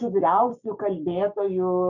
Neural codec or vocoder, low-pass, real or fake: codec, 16 kHz, 6 kbps, DAC; 7.2 kHz; fake